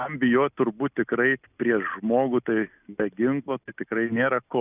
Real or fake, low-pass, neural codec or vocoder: real; 3.6 kHz; none